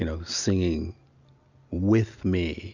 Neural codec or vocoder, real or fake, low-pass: none; real; 7.2 kHz